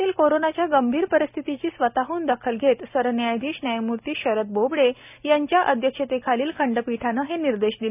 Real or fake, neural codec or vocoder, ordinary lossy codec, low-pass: real; none; none; 3.6 kHz